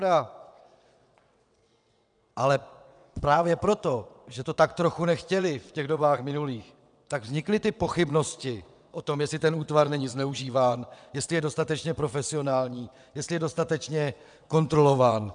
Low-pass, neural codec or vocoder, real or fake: 9.9 kHz; vocoder, 22.05 kHz, 80 mel bands, WaveNeXt; fake